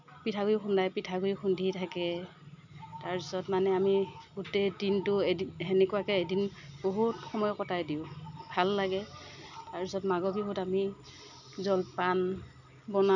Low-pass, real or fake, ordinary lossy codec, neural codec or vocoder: 7.2 kHz; real; none; none